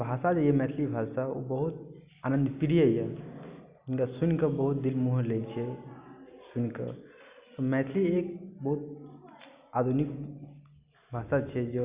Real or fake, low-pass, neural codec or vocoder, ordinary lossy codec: real; 3.6 kHz; none; Opus, 64 kbps